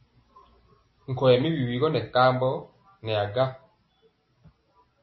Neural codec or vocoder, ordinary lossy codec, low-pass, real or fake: none; MP3, 24 kbps; 7.2 kHz; real